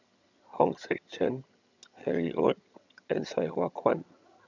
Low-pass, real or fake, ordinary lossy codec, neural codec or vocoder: 7.2 kHz; fake; none; vocoder, 22.05 kHz, 80 mel bands, HiFi-GAN